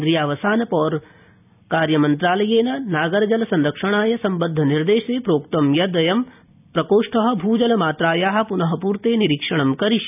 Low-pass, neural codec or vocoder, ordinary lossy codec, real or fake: 3.6 kHz; none; none; real